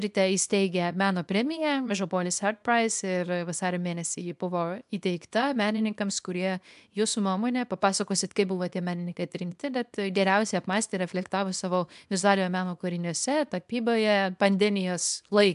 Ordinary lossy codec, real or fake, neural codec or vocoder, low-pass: AAC, 96 kbps; fake; codec, 24 kHz, 0.9 kbps, WavTokenizer, small release; 10.8 kHz